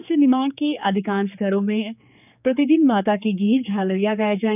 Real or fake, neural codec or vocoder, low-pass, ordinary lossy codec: fake; codec, 16 kHz, 4 kbps, X-Codec, HuBERT features, trained on general audio; 3.6 kHz; none